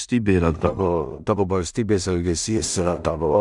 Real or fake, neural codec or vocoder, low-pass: fake; codec, 16 kHz in and 24 kHz out, 0.4 kbps, LongCat-Audio-Codec, two codebook decoder; 10.8 kHz